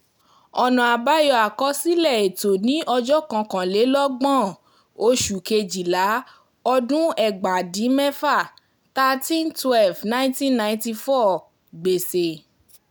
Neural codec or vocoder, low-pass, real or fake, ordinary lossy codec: none; none; real; none